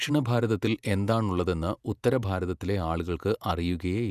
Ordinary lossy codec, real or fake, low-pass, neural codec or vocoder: none; fake; 14.4 kHz; vocoder, 48 kHz, 128 mel bands, Vocos